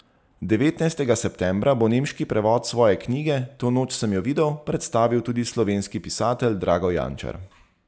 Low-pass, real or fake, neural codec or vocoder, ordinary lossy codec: none; real; none; none